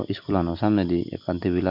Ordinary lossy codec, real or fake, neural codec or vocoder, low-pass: MP3, 48 kbps; real; none; 5.4 kHz